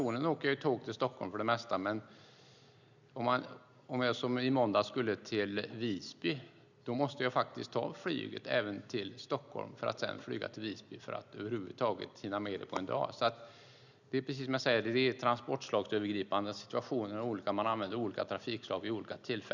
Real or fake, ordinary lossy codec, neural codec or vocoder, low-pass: real; none; none; 7.2 kHz